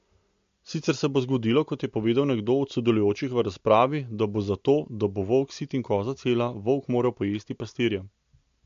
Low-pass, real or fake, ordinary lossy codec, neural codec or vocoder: 7.2 kHz; real; MP3, 48 kbps; none